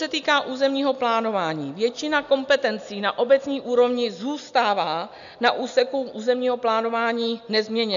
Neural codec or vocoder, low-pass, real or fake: none; 7.2 kHz; real